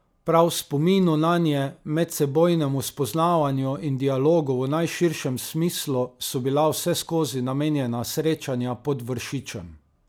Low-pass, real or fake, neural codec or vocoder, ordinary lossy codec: none; real; none; none